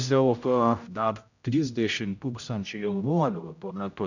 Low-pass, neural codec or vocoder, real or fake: 7.2 kHz; codec, 16 kHz, 0.5 kbps, X-Codec, HuBERT features, trained on general audio; fake